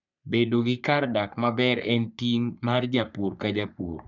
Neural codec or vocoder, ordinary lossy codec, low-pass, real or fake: codec, 44.1 kHz, 3.4 kbps, Pupu-Codec; none; 7.2 kHz; fake